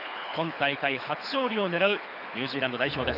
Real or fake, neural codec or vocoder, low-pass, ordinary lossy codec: fake; codec, 24 kHz, 6 kbps, HILCodec; 5.4 kHz; none